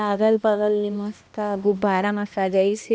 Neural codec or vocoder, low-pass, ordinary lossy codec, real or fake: codec, 16 kHz, 1 kbps, X-Codec, HuBERT features, trained on balanced general audio; none; none; fake